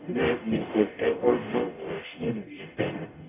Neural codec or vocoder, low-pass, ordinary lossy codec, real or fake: codec, 44.1 kHz, 0.9 kbps, DAC; 3.6 kHz; none; fake